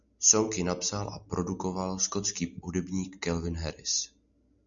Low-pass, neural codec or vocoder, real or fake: 7.2 kHz; none; real